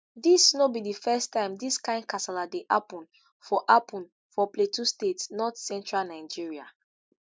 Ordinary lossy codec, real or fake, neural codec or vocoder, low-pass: none; real; none; none